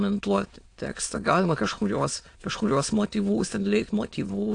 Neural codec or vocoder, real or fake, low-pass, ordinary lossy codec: autoencoder, 22.05 kHz, a latent of 192 numbers a frame, VITS, trained on many speakers; fake; 9.9 kHz; AAC, 48 kbps